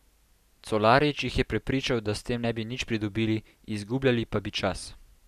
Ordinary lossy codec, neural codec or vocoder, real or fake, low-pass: none; none; real; 14.4 kHz